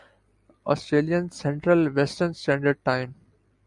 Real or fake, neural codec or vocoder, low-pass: real; none; 10.8 kHz